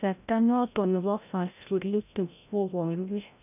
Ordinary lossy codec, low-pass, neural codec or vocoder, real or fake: none; 3.6 kHz; codec, 16 kHz, 0.5 kbps, FreqCodec, larger model; fake